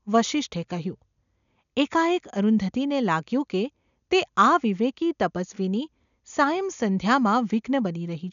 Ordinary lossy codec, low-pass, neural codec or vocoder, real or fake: none; 7.2 kHz; none; real